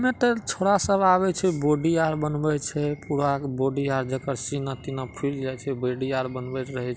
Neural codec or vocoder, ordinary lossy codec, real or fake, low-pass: none; none; real; none